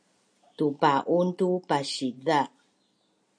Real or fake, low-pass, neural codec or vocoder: real; 9.9 kHz; none